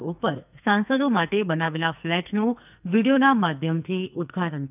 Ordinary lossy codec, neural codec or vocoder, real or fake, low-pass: none; codec, 44.1 kHz, 2.6 kbps, SNAC; fake; 3.6 kHz